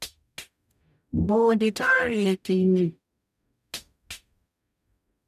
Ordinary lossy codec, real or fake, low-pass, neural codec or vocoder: none; fake; 14.4 kHz; codec, 44.1 kHz, 0.9 kbps, DAC